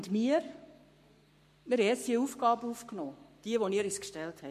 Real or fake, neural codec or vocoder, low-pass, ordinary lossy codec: fake; codec, 44.1 kHz, 7.8 kbps, Pupu-Codec; 14.4 kHz; MP3, 64 kbps